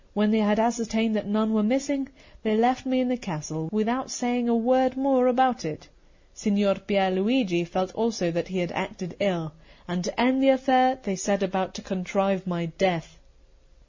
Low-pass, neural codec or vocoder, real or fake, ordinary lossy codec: 7.2 kHz; none; real; MP3, 64 kbps